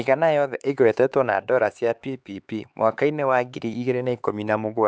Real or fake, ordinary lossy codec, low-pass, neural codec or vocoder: fake; none; none; codec, 16 kHz, 4 kbps, X-Codec, HuBERT features, trained on LibriSpeech